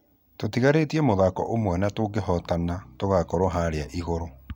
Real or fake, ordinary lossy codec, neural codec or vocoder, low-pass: real; none; none; 19.8 kHz